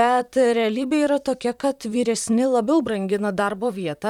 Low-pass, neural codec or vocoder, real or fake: 19.8 kHz; vocoder, 44.1 kHz, 128 mel bands, Pupu-Vocoder; fake